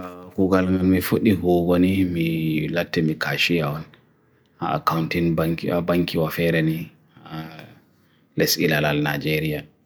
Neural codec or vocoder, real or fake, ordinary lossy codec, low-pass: none; real; none; none